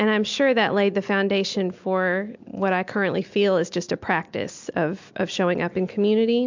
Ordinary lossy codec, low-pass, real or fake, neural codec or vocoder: MP3, 64 kbps; 7.2 kHz; real; none